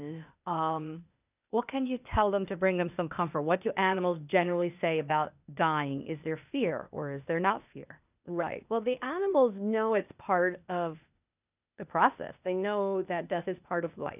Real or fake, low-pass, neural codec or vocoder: fake; 3.6 kHz; codec, 16 kHz, 0.8 kbps, ZipCodec